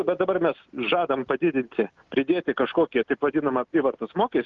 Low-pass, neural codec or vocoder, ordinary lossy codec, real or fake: 7.2 kHz; none; Opus, 32 kbps; real